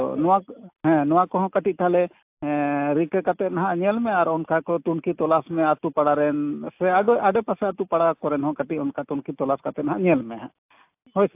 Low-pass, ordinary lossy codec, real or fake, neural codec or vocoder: 3.6 kHz; AAC, 32 kbps; real; none